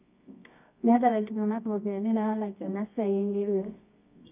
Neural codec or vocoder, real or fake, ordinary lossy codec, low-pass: codec, 24 kHz, 0.9 kbps, WavTokenizer, medium music audio release; fake; none; 3.6 kHz